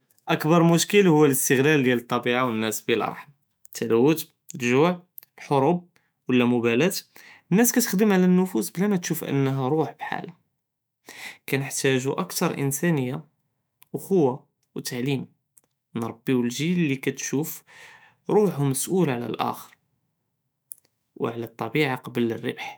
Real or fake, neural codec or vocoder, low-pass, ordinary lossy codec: fake; autoencoder, 48 kHz, 128 numbers a frame, DAC-VAE, trained on Japanese speech; none; none